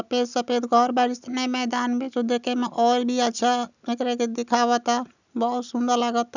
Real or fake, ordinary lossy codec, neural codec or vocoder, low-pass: real; none; none; 7.2 kHz